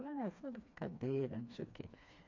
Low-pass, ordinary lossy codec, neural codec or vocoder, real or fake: 7.2 kHz; MP3, 48 kbps; codec, 16 kHz, 2 kbps, FreqCodec, smaller model; fake